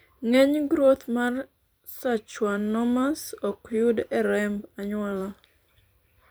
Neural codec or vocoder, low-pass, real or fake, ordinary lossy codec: vocoder, 44.1 kHz, 128 mel bands every 256 samples, BigVGAN v2; none; fake; none